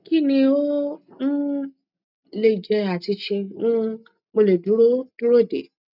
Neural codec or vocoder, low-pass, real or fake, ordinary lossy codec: none; 5.4 kHz; real; none